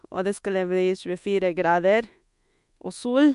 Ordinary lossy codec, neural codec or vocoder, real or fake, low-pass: MP3, 64 kbps; codec, 24 kHz, 1.2 kbps, DualCodec; fake; 10.8 kHz